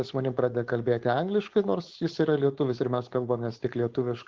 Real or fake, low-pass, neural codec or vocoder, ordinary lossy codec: fake; 7.2 kHz; codec, 16 kHz, 4.8 kbps, FACodec; Opus, 16 kbps